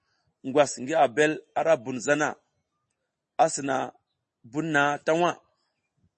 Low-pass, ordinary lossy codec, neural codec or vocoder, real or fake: 10.8 kHz; MP3, 32 kbps; none; real